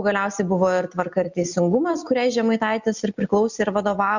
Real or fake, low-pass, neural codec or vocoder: real; 7.2 kHz; none